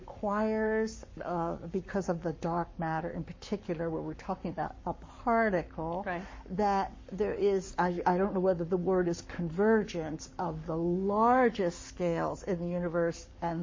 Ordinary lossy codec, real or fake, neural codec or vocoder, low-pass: MP3, 32 kbps; fake; codec, 44.1 kHz, 7.8 kbps, Pupu-Codec; 7.2 kHz